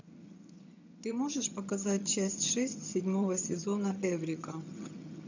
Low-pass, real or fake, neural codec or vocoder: 7.2 kHz; fake; vocoder, 22.05 kHz, 80 mel bands, HiFi-GAN